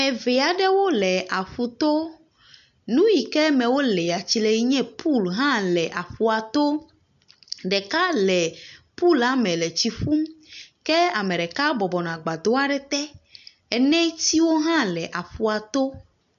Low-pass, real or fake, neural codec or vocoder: 7.2 kHz; real; none